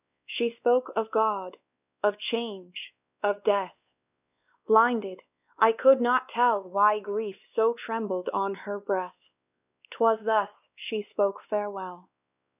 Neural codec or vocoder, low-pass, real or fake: codec, 16 kHz, 2 kbps, X-Codec, WavLM features, trained on Multilingual LibriSpeech; 3.6 kHz; fake